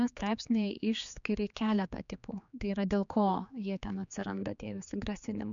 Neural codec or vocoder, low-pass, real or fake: codec, 16 kHz, 4 kbps, X-Codec, HuBERT features, trained on general audio; 7.2 kHz; fake